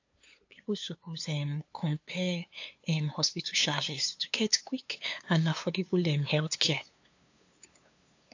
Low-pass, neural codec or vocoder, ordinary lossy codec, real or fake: 7.2 kHz; codec, 16 kHz, 2 kbps, FunCodec, trained on LibriTTS, 25 frames a second; AAC, 48 kbps; fake